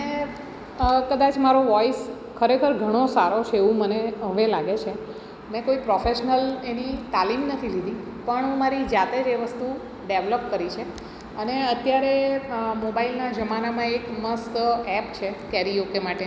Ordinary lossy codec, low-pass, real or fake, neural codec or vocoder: none; none; real; none